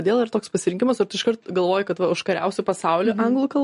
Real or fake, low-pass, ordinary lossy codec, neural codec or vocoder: real; 10.8 kHz; MP3, 48 kbps; none